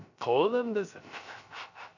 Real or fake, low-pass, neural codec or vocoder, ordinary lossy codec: fake; 7.2 kHz; codec, 16 kHz, 0.3 kbps, FocalCodec; none